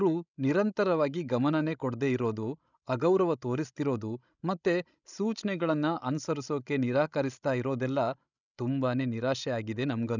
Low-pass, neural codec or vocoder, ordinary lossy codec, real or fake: 7.2 kHz; codec, 16 kHz, 16 kbps, FreqCodec, larger model; none; fake